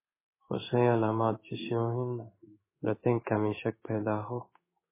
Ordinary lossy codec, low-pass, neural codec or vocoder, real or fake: MP3, 16 kbps; 3.6 kHz; codec, 16 kHz in and 24 kHz out, 1 kbps, XY-Tokenizer; fake